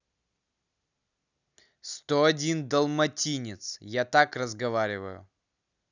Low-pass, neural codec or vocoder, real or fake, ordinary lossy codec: 7.2 kHz; none; real; none